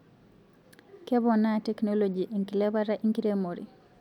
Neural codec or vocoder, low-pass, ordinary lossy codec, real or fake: none; 19.8 kHz; none; real